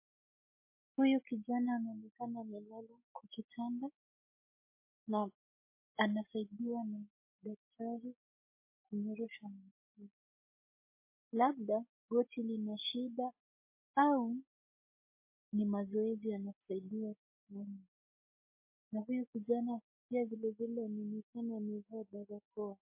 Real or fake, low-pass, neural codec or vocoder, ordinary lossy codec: real; 3.6 kHz; none; MP3, 24 kbps